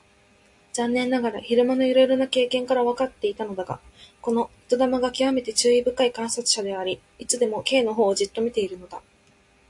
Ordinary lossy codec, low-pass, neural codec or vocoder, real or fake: AAC, 64 kbps; 10.8 kHz; none; real